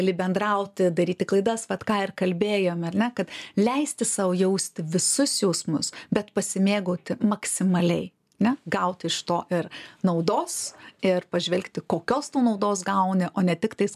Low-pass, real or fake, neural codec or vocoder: 14.4 kHz; real; none